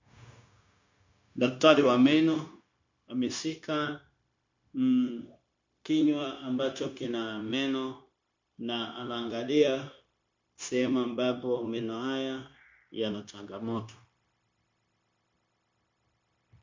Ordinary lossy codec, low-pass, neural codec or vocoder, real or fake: MP3, 48 kbps; 7.2 kHz; codec, 16 kHz, 0.9 kbps, LongCat-Audio-Codec; fake